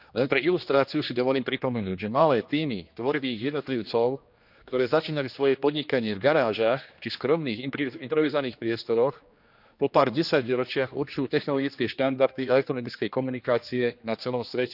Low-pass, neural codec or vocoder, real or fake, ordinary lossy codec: 5.4 kHz; codec, 16 kHz, 2 kbps, X-Codec, HuBERT features, trained on general audio; fake; none